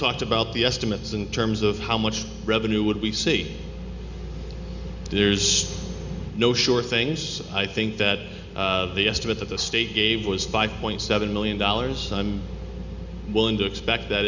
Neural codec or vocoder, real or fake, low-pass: none; real; 7.2 kHz